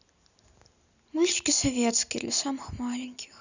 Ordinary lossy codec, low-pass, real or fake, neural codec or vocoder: none; 7.2 kHz; real; none